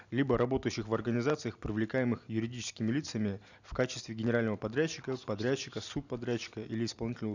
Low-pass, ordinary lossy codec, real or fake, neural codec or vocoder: 7.2 kHz; none; real; none